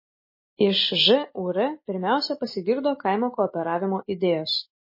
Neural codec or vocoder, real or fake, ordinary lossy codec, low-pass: none; real; MP3, 24 kbps; 5.4 kHz